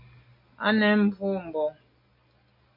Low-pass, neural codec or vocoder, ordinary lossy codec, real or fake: 5.4 kHz; none; MP3, 32 kbps; real